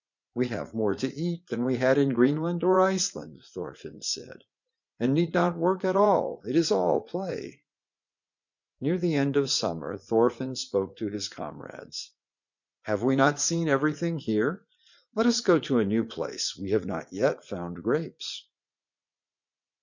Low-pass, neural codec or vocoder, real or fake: 7.2 kHz; vocoder, 44.1 kHz, 80 mel bands, Vocos; fake